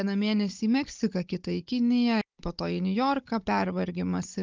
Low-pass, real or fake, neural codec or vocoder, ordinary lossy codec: 7.2 kHz; fake; codec, 16 kHz, 16 kbps, FunCodec, trained on Chinese and English, 50 frames a second; Opus, 24 kbps